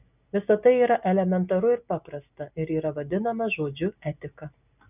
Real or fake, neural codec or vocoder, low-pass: real; none; 3.6 kHz